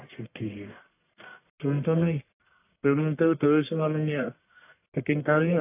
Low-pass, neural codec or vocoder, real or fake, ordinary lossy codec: 3.6 kHz; codec, 44.1 kHz, 1.7 kbps, Pupu-Codec; fake; AAC, 24 kbps